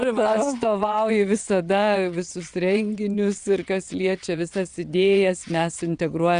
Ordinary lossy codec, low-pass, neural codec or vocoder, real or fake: Opus, 64 kbps; 9.9 kHz; vocoder, 22.05 kHz, 80 mel bands, WaveNeXt; fake